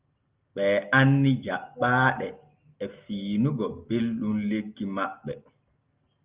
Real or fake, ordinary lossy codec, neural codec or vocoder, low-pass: real; Opus, 32 kbps; none; 3.6 kHz